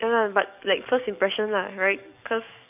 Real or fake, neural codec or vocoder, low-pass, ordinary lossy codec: fake; codec, 44.1 kHz, 7.8 kbps, DAC; 3.6 kHz; none